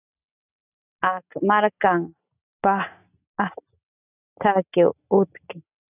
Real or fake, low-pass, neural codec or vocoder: real; 3.6 kHz; none